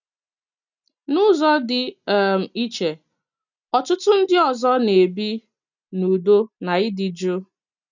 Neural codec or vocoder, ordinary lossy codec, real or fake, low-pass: none; none; real; 7.2 kHz